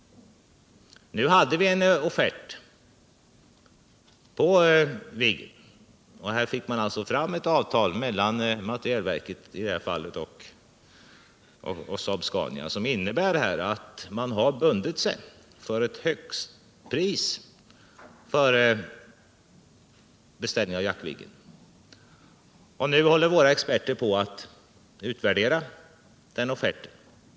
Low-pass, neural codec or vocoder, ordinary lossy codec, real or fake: none; none; none; real